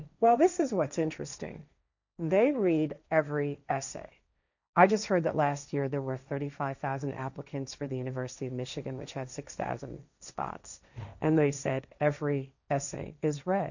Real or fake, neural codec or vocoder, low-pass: fake; codec, 16 kHz, 1.1 kbps, Voila-Tokenizer; 7.2 kHz